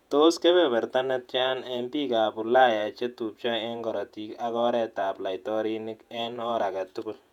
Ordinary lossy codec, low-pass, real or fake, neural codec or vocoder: none; 19.8 kHz; fake; vocoder, 48 kHz, 128 mel bands, Vocos